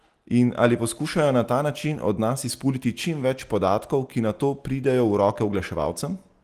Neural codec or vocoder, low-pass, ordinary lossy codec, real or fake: none; 14.4 kHz; Opus, 24 kbps; real